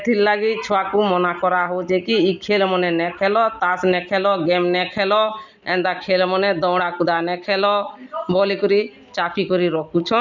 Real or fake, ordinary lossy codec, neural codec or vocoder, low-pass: real; none; none; 7.2 kHz